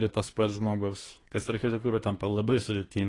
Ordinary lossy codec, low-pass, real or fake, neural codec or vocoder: AAC, 32 kbps; 10.8 kHz; fake; codec, 24 kHz, 1 kbps, SNAC